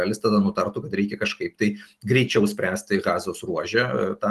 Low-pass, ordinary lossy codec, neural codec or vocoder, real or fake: 14.4 kHz; Opus, 24 kbps; none; real